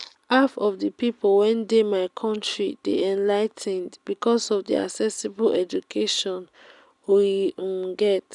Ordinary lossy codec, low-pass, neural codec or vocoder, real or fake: none; 10.8 kHz; none; real